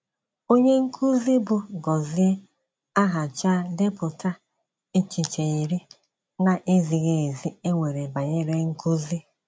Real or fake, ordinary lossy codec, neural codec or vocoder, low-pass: real; none; none; none